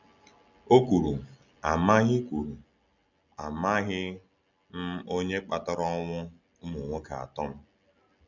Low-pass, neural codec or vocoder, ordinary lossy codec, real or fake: 7.2 kHz; none; none; real